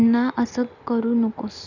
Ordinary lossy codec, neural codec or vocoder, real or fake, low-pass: none; none; real; 7.2 kHz